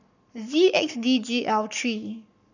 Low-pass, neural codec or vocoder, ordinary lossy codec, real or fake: 7.2 kHz; codec, 44.1 kHz, 7.8 kbps, Pupu-Codec; none; fake